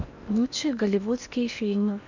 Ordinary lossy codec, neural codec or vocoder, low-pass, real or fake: none; codec, 16 kHz in and 24 kHz out, 0.8 kbps, FocalCodec, streaming, 65536 codes; 7.2 kHz; fake